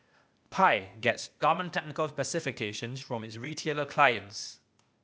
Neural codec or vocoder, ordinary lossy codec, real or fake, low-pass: codec, 16 kHz, 0.8 kbps, ZipCodec; none; fake; none